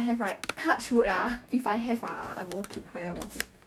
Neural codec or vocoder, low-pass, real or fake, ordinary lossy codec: codec, 44.1 kHz, 2.6 kbps, DAC; 19.8 kHz; fake; none